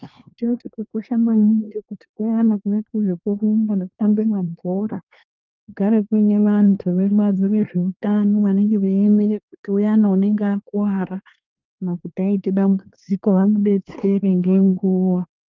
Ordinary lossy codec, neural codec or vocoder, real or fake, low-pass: Opus, 24 kbps; codec, 24 kHz, 1 kbps, SNAC; fake; 7.2 kHz